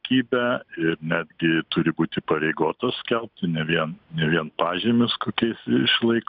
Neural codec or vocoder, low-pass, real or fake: none; 5.4 kHz; real